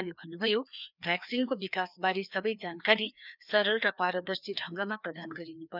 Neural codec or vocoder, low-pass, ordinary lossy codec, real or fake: codec, 16 kHz, 2 kbps, FreqCodec, larger model; 5.4 kHz; none; fake